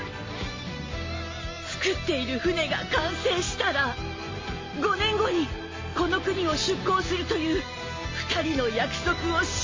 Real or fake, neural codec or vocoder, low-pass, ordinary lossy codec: real; none; 7.2 kHz; MP3, 32 kbps